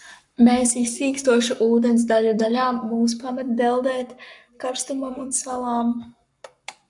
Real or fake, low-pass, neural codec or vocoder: fake; 10.8 kHz; codec, 44.1 kHz, 7.8 kbps, Pupu-Codec